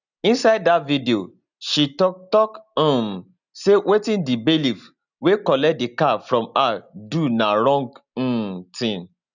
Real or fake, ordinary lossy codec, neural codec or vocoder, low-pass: real; none; none; 7.2 kHz